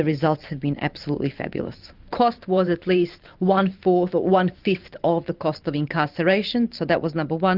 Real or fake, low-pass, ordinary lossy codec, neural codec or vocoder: fake; 5.4 kHz; Opus, 32 kbps; vocoder, 44.1 kHz, 128 mel bands every 512 samples, BigVGAN v2